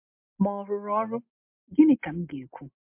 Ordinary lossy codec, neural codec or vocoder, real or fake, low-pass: MP3, 32 kbps; none; real; 3.6 kHz